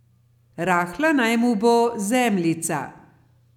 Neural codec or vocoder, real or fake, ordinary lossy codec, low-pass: none; real; none; 19.8 kHz